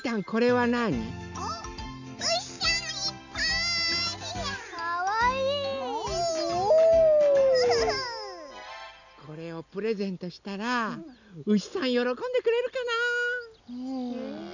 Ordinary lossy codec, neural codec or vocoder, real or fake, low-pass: none; none; real; 7.2 kHz